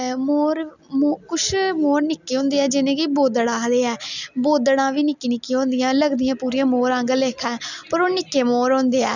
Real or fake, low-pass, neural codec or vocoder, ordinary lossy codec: real; 7.2 kHz; none; none